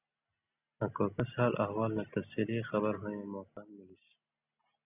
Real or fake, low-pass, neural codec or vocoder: real; 3.6 kHz; none